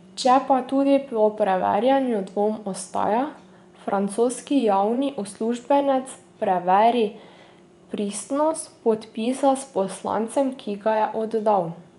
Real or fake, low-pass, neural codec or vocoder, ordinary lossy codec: fake; 10.8 kHz; vocoder, 24 kHz, 100 mel bands, Vocos; none